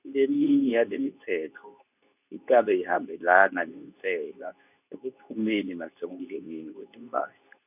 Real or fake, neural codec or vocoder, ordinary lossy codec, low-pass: fake; codec, 24 kHz, 0.9 kbps, WavTokenizer, medium speech release version 2; none; 3.6 kHz